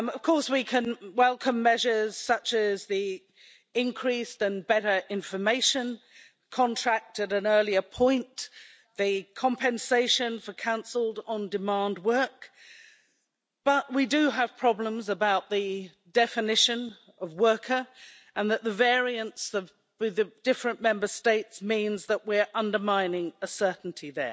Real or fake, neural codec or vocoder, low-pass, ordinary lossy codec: real; none; none; none